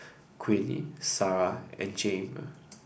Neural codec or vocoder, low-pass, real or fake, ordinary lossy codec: none; none; real; none